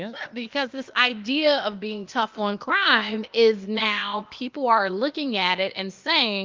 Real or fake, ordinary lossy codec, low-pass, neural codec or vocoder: fake; Opus, 24 kbps; 7.2 kHz; codec, 16 kHz, 0.8 kbps, ZipCodec